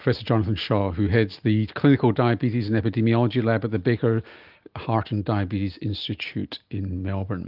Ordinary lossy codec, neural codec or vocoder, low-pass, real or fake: Opus, 24 kbps; none; 5.4 kHz; real